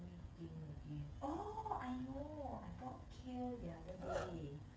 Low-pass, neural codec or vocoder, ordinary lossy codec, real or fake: none; codec, 16 kHz, 16 kbps, FreqCodec, smaller model; none; fake